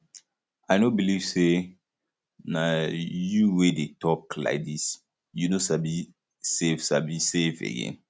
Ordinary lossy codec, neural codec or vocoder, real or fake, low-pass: none; none; real; none